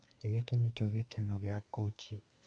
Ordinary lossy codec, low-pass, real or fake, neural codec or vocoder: none; 9.9 kHz; fake; codec, 24 kHz, 1 kbps, SNAC